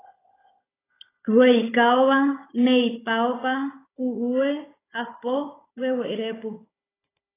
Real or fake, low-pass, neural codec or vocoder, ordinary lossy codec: fake; 3.6 kHz; codec, 16 kHz, 16 kbps, FunCodec, trained on Chinese and English, 50 frames a second; AAC, 16 kbps